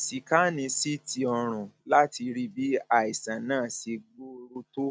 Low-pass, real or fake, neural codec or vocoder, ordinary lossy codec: none; real; none; none